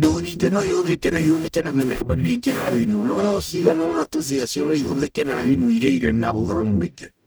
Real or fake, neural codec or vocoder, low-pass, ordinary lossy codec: fake; codec, 44.1 kHz, 0.9 kbps, DAC; none; none